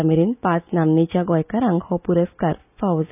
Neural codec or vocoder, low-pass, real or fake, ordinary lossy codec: none; 3.6 kHz; real; none